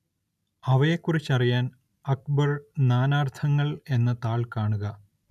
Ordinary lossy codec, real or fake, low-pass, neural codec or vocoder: none; real; 14.4 kHz; none